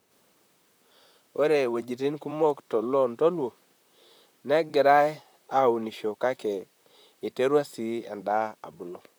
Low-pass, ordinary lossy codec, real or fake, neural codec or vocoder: none; none; fake; vocoder, 44.1 kHz, 128 mel bands, Pupu-Vocoder